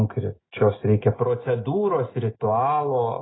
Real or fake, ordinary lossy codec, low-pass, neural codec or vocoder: real; AAC, 16 kbps; 7.2 kHz; none